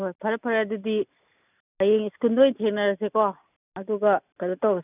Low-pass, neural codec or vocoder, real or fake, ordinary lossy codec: 3.6 kHz; none; real; none